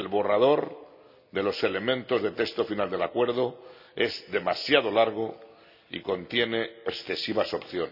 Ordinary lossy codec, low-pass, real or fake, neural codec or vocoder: none; 5.4 kHz; real; none